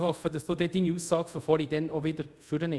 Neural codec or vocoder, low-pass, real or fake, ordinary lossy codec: codec, 24 kHz, 0.5 kbps, DualCodec; none; fake; none